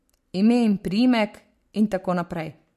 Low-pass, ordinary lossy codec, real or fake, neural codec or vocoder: 14.4 kHz; MP3, 64 kbps; real; none